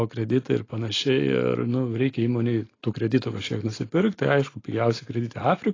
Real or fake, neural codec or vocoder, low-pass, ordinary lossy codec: real; none; 7.2 kHz; AAC, 32 kbps